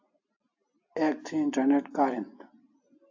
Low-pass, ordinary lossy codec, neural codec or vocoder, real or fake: 7.2 kHz; AAC, 48 kbps; none; real